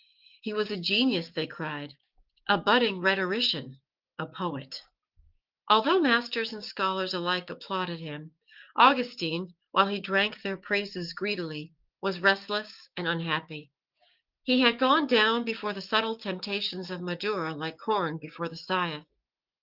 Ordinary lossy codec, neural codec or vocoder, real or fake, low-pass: Opus, 24 kbps; codec, 16 kHz, 6 kbps, DAC; fake; 5.4 kHz